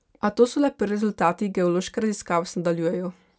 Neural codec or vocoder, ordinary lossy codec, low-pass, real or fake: none; none; none; real